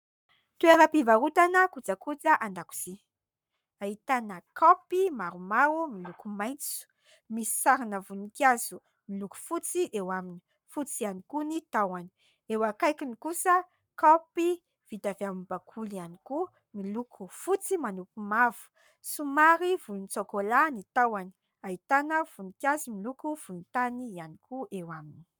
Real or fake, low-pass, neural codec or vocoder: fake; 19.8 kHz; codec, 44.1 kHz, 7.8 kbps, Pupu-Codec